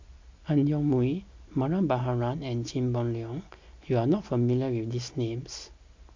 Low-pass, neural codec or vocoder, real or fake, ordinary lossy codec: 7.2 kHz; none; real; MP3, 48 kbps